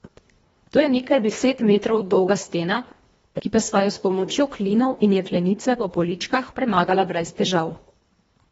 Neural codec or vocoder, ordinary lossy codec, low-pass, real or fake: codec, 24 kHz, 1.5 kbps, HILCodec; AAC, 24 kbps; 10.8 kHz; fake